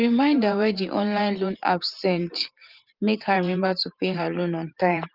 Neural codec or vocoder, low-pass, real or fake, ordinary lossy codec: vocoder, 44.1 kHz, 128 mel bands every 512 samples, BigVGAN v2; 5.4 kHz; fake; Opus, 24 kbps